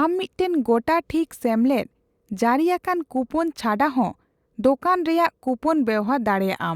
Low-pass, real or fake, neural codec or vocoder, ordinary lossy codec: 19.8 kHz; real; none; Opus, 64 kbps